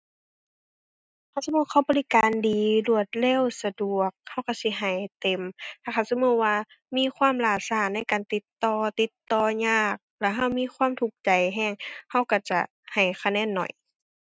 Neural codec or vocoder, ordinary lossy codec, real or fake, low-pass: none; none; real; none